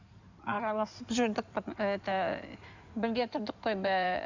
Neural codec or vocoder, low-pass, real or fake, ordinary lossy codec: codec, 16 kHz in and 24 kHz out, 2.2 kbps, FireRedTTS-2 codec; 7.2 kHz; fake; none